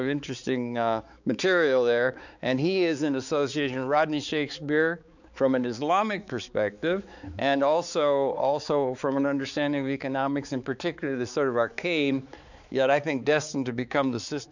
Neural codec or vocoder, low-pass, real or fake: codec, 16 kHz, 4 kbps, X-Codec, HuBERT features, trained on balanced general audio; 7.2 kHz; fake